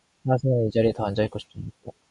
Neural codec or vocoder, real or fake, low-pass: none; real; 10.8 kHz